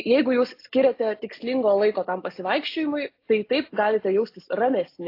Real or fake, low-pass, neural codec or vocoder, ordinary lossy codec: real; 5.4 kHz; none; AAC, 32 kbps